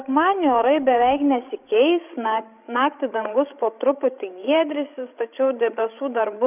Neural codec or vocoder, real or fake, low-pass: codec, 16 kHz, 16 kbps, FreqCodec, larger model; fake; 3.6 kHz